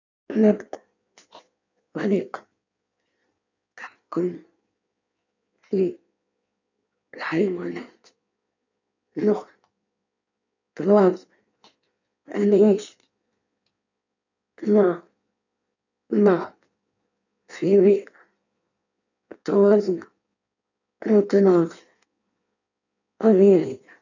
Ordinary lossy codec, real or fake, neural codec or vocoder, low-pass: none; fake; codec, 16 kHz in and 24 kHz out, 1.1 kbps, FireRedTTS-2 codec; 7.2 kHz